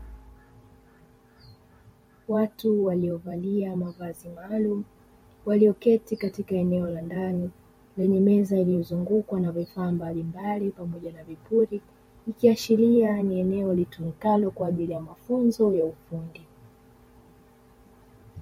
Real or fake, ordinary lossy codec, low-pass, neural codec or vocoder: fake; MP3, 64 kbps; 19.8 kHz; vocoder, 44.1 kHz, 128 mel bands every 512 samples, BigVGAN v2